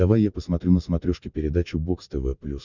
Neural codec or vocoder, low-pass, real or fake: none; 7.2 kHz; real